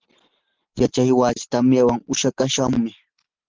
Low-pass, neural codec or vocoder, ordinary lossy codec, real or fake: 7.2 kHz; none; Opus, 16 kbps; real